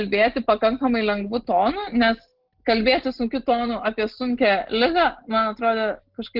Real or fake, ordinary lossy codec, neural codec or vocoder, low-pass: real; Opus, 16 kbps; none; 5.4 kHz